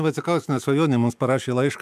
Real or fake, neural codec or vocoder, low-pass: fake; autoencoder, 48 kHz, 128 numbers a frame, DAC-VAE, trained on Japanese speech; 14.4 kHz